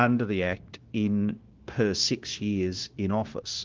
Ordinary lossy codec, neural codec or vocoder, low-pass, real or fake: Opus, 16 kbps; codec, 16 kHz, 0.9 kbps, LongCat-Audio-Codec; 7.2 kHz; fake